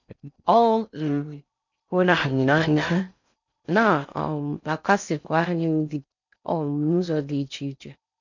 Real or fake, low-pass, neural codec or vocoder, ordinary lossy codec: fake; 7.2 kHz; codec, 16 kHz in and 24 kHz out, 0.6 kbps, FocalCodec, streaming, 4096 codes; none